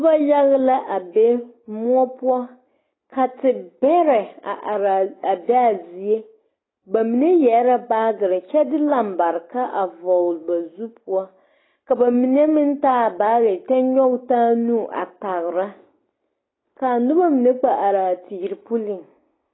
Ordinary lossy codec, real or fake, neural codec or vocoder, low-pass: AAC, 16 kbps; real; none; 7.2 kHz